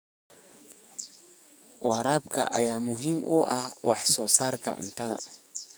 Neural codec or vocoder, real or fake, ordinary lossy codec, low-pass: codec, 44.1 kHz, 2.6 kbps, SNAC; fake; none; none